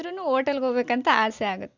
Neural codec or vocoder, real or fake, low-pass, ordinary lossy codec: none; real; 7.2 kHz; Opus, 64 kbps